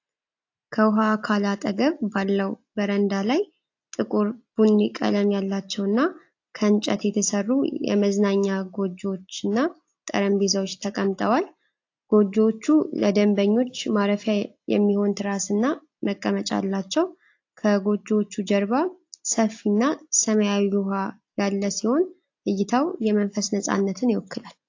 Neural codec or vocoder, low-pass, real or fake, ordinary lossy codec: none; 7.2 kHz; real; AAC, 48 kbps